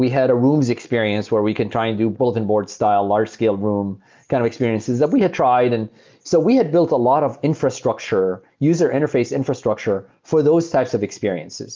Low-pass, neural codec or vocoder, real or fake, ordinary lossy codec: 7.2 kHz; none; real; Opus, 24 kbps